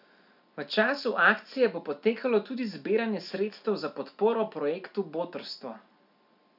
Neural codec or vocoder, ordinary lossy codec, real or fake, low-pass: none; none; real; 5.4 kHz